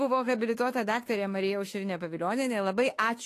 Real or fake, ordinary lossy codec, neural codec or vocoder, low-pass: fake; AAC, 48 kbps; autoencoder, 48 kHz, 32 numbers a frame, DAC-VAE, trained on Japanese speech; 14.4 kHz